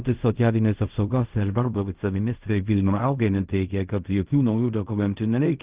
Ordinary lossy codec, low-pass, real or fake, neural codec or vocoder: Opus, 32 kbps; 3.6 kHz; fake; codec, 16 kHz in and 24 kHz out, 0.4 kbps, LongCat-Audio-Codec, fine tuned four codebook decoder